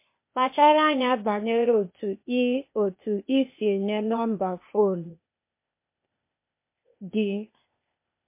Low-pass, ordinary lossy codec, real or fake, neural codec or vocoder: 3.6 kHz; MP3, 24 kbps; fake; codec, 16 kHz, 0.8 kbps, ZipCodec